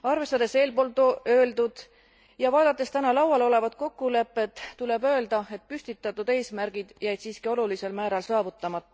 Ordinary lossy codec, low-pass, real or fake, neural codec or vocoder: none; none; real; none